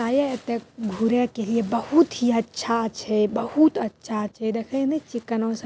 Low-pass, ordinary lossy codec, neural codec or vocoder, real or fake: none; none; none; real